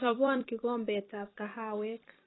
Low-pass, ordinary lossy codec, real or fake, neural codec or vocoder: 7.2 kHz; AAC, 16 kbps; fake; vocoder, 44.1 kHz, 128 mel bands every 256 samples, BigVGAN v2